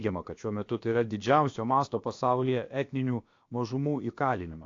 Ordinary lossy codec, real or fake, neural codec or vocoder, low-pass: AAC, 48 kbps; fake; codec, 16 kHz, about 1 kbps, DyCAST, with the encoder's durations; 7.2 kHz